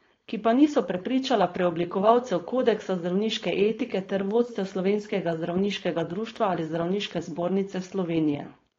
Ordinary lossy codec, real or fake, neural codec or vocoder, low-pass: AAC, 32 kbps; fake; codec, 16 kHz, 4.8 kbps, FACodec; 7.2 kHz